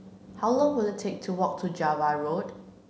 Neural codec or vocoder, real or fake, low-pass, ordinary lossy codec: none; real; none; none